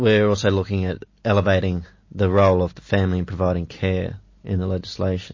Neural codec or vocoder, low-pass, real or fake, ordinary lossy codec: none; 7.2 kHz; real; MP3, 32 kbps